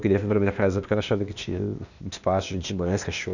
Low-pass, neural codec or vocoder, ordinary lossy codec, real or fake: 7.2 kHz; codec, 16 kHz, 0.8 kbps, ZipCodec; none; fake